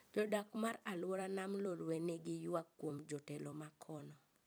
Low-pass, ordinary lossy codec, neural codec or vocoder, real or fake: none; none; vocoder, 44.1 kHz, 128 mel bands every 256 samples, BigVGAN v2; fake